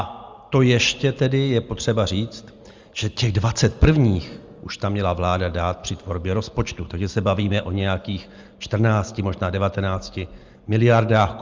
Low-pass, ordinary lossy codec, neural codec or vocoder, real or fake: 7.2 kHz; Opus, 32 kbps; none; real